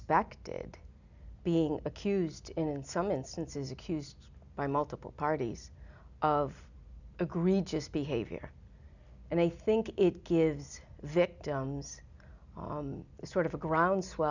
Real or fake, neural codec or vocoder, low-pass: real; none; 7.2 kHz